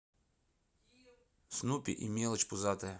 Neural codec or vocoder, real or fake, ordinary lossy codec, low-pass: none; real; none; none